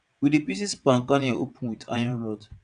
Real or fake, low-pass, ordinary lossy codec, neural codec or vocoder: fake; 9.9 kHz; none; vocoder, 22.05 kHz, 80 mel bands, WaveNeXt